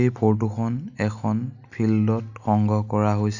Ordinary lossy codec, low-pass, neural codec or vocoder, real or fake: none; 7.2 kHz; none; real